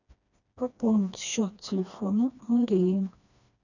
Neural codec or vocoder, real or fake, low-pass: codec, 16 kHz, 2 kbps, FreqCodec, smaller model; fake; 7.2 kHz